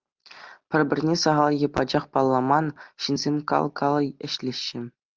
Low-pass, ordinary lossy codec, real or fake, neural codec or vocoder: 7.2 kHz; Opus, 32 kbps; real; none